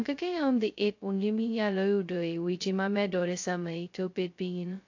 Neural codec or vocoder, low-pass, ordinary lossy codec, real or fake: codec, 16 kHz, 0.2 kbps, FocalCodec; 7.2 kHz; AAC, 48 kbps; fake